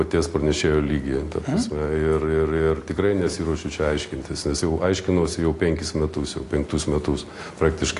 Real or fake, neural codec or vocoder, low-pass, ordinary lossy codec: real; none; 10.8 kHz; AAC, 48 kbps